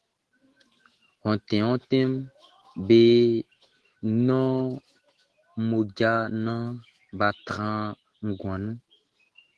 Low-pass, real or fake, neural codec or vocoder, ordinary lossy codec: 10.8 kHz; fake; autoencoder, 48 kHz, 128 numbers a frame, DAC-VAE, trained on Japanese speech; Opus, 16 kbps